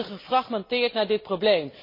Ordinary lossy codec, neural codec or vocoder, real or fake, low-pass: MP3, 24 kbps; none; real; 5.4 kHz